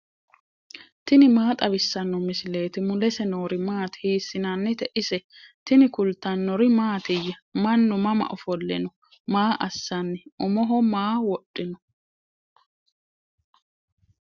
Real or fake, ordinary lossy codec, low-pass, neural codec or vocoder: real; Opus, 64 kbps; 7.2 kHz; none